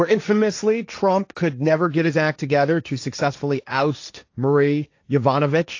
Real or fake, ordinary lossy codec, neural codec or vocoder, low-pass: fake; AAC, 48 kbps; codec, 16 kHz, 1.1 kbps, Voila-Tokenizer; 7.2 kHz